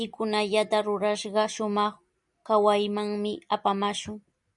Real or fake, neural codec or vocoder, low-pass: real; none; 10.8 kHz